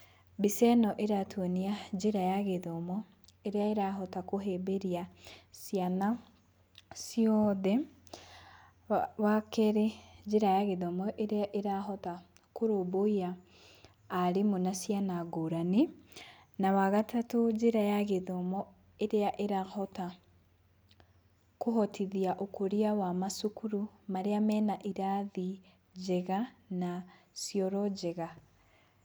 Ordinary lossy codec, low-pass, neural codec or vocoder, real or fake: none; none; none; real